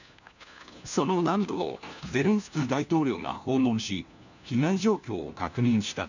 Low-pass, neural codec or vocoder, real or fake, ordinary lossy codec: 7.2 kHz; codec, 16 kHz, 1 kbps, FunCodec, trained on LibriTTS, 50 frames a second; fake; none